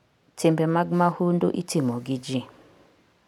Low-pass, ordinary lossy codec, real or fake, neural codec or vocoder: 19.8 kHz; none; real; none